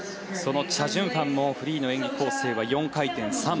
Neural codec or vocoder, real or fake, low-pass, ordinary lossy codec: none; real; none; none